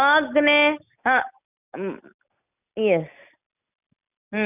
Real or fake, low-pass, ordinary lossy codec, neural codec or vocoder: real; 3.6 kHz; none; none